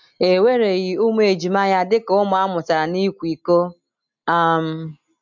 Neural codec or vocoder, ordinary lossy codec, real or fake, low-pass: none; MP3, 64 kbps; real; 7.2 kHz